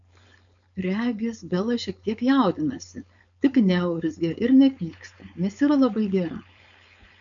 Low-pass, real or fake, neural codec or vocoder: 7.2 kHz; fake; codec, 16 kHz, 4.8 kbps, FACodec